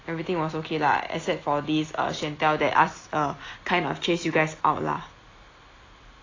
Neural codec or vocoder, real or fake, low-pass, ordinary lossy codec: none; real; 7.2 kHz; AAC, 32 kbps